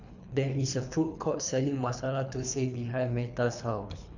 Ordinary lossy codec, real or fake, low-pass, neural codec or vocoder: none; fake; 7.2 kHz; codec, 24 kHz, 3 kbps, HILCodec